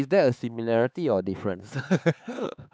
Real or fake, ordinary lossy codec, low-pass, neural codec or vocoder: fake; none; none; codec, 16 kHz, 4 kbps, X-Codec, HuBERT features, trained on LibriSpeech